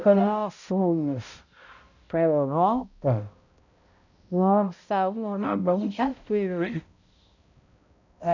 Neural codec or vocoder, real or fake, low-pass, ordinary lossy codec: codec, 16 kHz, 0.5 kbps, X-Codec, HuBERT features, trained on balanced general audio; fake; 7.2 kHz; none